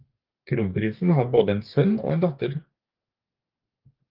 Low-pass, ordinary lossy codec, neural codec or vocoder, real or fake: 5.4 kHz; Opus, 32 kbps; codec, 44.1 kHz, 2.6 kbps, DAC; fake